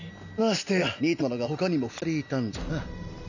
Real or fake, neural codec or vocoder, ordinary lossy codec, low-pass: real; none; none; 7.2 kHz